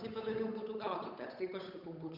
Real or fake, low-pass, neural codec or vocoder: fake; 5.4 kHz; codec, 16 kHz, 8 kbps, FunCodec, trained on Chinese and English, 25 frames a second